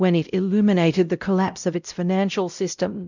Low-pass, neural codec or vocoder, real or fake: 7.2 kHz; codec, 16 kHz, 0.5 kbps, X-Codec, WavLM features, trained on Multilingual LibriSpeech; fake